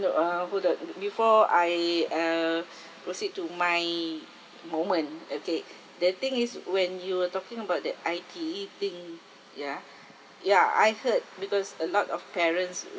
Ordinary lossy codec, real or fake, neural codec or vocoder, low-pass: none; real; none; none